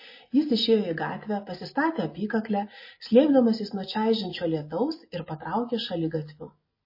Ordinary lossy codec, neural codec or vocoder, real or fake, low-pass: MP3, 24 kbps; none; real; 5.4 kHz